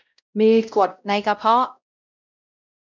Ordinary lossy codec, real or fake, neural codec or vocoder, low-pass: none; fake; codec, 16 kHz, 0.5 kbps, X-Codec, WavLM features, trained on Multilingual LibriSpeech; 7.2 kHz